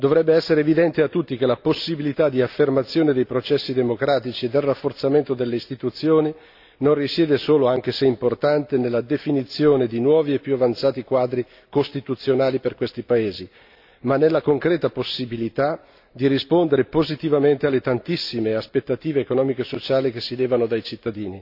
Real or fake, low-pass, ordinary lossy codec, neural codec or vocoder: real; 5.4 kHz; AAC, 48 kbps; none